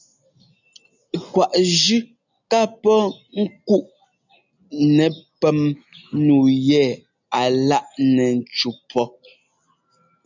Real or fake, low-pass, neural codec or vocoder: real; 7.2 kHz; none